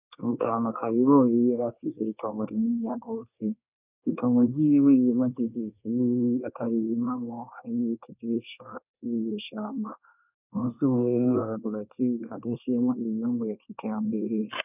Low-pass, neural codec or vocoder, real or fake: 3.6 kHz; codec, 24 kHz, 1 kbps, SNAC; fake